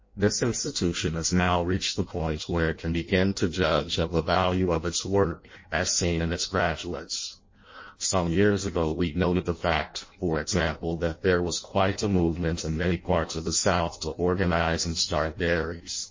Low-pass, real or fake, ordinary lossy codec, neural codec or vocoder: 7.2 kHz; fake; MP3, 32 kbps; codec, 16 kHz in and 24 kHz out, 0.6 kbps, FireRedTTS-2 codec